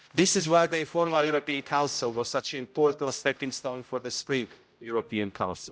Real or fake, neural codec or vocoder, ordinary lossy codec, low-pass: fake; codec, 16 kHz, 0.5 kbps, X-Codec, HuBERT features, trained on general audio; none; none